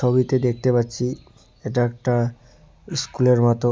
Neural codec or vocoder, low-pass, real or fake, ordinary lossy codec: none; none; real; none